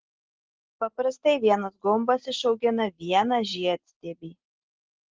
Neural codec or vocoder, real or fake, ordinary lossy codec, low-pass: none; real; Opus, 16 kbps; 7.2 kHz